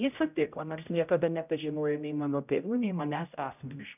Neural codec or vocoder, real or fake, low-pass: codec, 16 kHz, 0.5 kbps, X-Codec, HuBERT features, trained on general audio; fake; 3.6 kHz